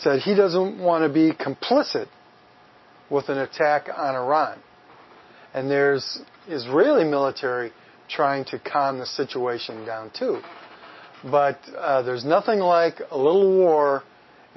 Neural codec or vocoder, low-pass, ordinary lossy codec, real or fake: none; 7.2 kHz; MP3, 24 kbps; real